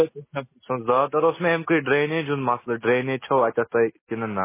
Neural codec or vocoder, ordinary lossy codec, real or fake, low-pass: none; MP3, 16 kbps; real; 3.6 kHz